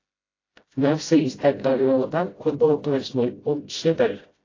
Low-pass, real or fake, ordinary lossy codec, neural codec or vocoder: 7.2 kHz; fake; AAC, 32 kbps; codec, 16 kHz, 0.5 kbps, FreqCodec, smaller model